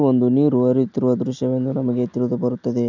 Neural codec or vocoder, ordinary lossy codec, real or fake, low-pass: none; none; real; 7.2 kHz